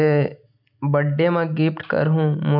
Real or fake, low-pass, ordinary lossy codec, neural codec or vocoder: real; 5.4 kHz; none; none